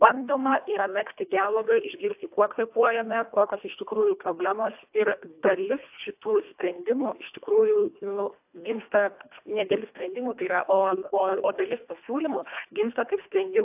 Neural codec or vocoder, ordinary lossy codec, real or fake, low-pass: codec, 24 kHz, 1.5 kbps, HILCodec; AAC, 32 kbps; fake; 3.6 kHz